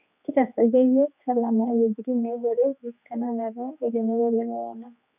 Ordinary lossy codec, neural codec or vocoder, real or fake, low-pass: none; codec, 16 kHz, 2 kbps, X-Codec, HuBERT features, trained on general audio; fake; 3.6 kHz